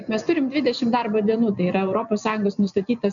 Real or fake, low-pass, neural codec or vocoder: real; 7.2 kHz; none